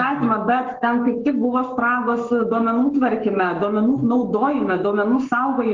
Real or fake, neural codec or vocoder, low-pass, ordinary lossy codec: fake; codec, 44.1 kHz, 7.8 kbps, Pupu-Codec; 7.2 kHz; Opus, 16 kbps